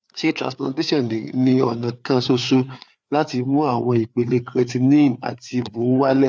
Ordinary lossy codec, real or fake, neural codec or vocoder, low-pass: none; fake; codec, 16 kHz, 4 kbps, FreqCodec, larger model; none